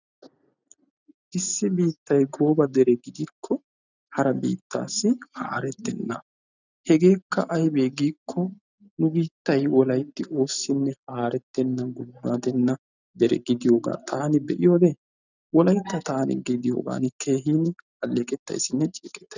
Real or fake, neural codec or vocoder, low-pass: real; none; 7.2 kHz